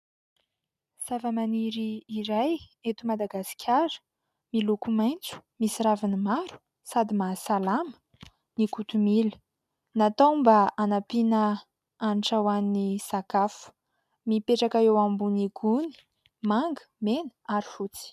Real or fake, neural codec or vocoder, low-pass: real; none; 14.4 kHz